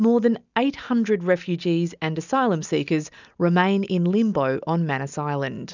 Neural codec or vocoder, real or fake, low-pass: none; real; 7.2 kHz